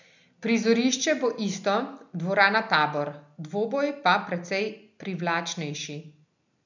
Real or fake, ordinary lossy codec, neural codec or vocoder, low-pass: real; none; none; 7.2 kHz